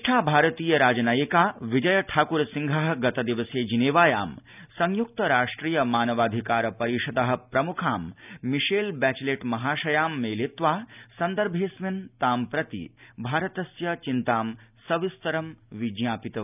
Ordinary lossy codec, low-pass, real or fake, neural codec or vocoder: none; 3.6 kHz; real; none